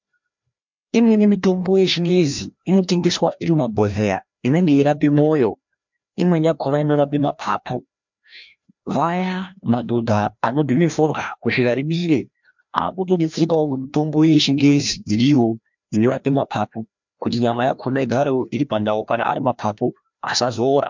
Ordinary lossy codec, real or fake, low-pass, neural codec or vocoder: MP3, 64 kbps; fake; 7.2 kHz; codec, 16 kHz, 1 kbps, FreqCodec, larger model